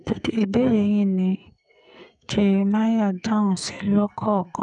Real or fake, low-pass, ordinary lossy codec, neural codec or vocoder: fake; 10.8 kHz; none; codec, 44.1 kHz, 2.6 kbps, SNAC